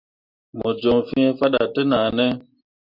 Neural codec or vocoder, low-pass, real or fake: none; 5.4 kHz; real